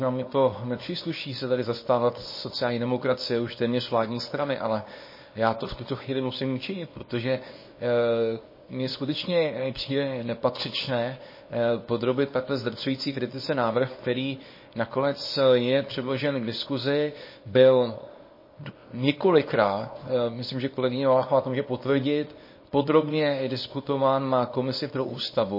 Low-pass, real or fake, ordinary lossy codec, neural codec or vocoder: 5.4 kHz; fake; MP3, 24 kbps; codec, 24 kHz, 0.9 kbps, WavTokenizer, small release